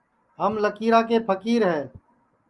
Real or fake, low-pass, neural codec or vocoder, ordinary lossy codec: real; 10.8 kHz; none; Opus, 32 kbps